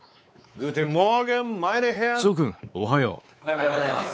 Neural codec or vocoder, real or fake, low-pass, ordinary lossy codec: codec, 16 kHz, 4 kbps, X-Codec, WavLM features, trained on Multilingual LibriSpeech; fake; none; none